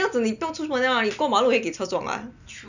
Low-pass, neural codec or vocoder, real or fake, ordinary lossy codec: 7.2 kHz; none; real; none